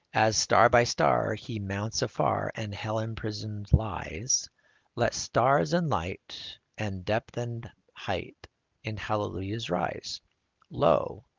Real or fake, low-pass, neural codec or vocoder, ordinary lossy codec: real; 7.2 kHz; none; Opus, 32 kbps